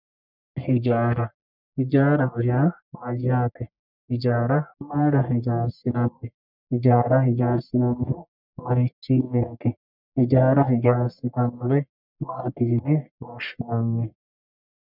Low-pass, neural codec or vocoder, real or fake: 5.4 kHz; codec, 44.1 kHz, 1.7 kbps, Pupu-Codec; fake